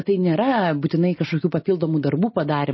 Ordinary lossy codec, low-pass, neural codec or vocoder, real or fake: MP3, 24 kbps; 7.2 kHz; none; real